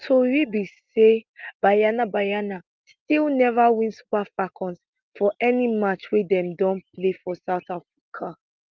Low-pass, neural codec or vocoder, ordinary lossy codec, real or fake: 7.2 kHz; none; Opus, 32 kbps; real